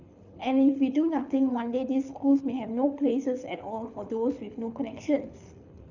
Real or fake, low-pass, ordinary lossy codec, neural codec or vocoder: fake; 7.2 kHz; none; codec, 24 kHz, 6 kbps, HILCodec